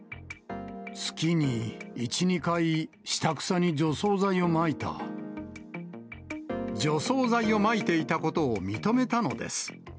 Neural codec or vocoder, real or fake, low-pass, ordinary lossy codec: none; real; none; none